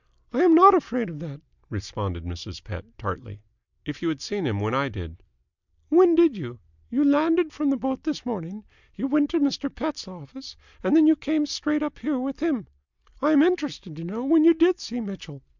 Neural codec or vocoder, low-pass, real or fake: none; 7.2 kHz; real